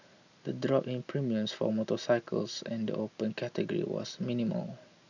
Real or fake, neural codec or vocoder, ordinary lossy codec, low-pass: real; none; none; 7.2 kHz